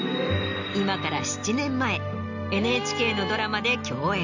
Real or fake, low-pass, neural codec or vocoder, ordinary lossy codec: real; 7.2 kHz; none; none